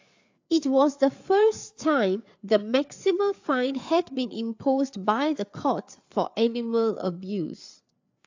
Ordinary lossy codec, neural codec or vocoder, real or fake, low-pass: AAC, 48 kbps; codec, 16 kHz, 4 kbps, FreqCodec, larger model; fake; 7.2 kHz